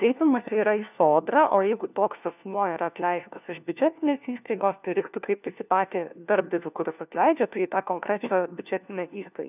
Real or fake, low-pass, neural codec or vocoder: fake; 3.6 kHz; codec, 16 kHz, 1 kbps, FunCodec, trained on LibriTTS, 50 frames a second